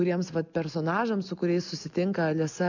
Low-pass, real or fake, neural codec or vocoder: 7.2 kHz; real; none